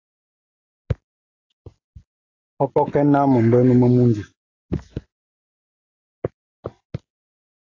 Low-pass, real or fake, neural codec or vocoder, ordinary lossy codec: 7.2 kHz; real; none; AAC, 32 kbps